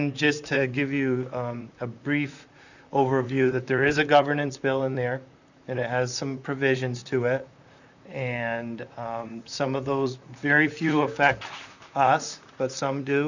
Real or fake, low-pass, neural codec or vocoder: fake; 7.2 kHz; vocoder, 44.1 kHz, 128 mel bands, Pupu-Vocoder